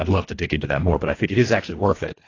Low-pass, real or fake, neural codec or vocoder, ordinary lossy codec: 7.2 kHz; fake; codec, 24 kHz, 1.5 kbps, HILCodec; AAC, 32 kbps